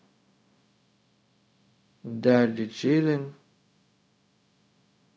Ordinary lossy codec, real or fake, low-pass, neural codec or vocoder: none; fake; none; codec, 16 kHz, 0.4 kbps, LongCat-Audio-Codec